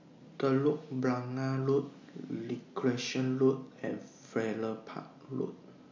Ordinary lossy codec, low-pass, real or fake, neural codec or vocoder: MP3, 48 kbps; 7.2 kHz; real; none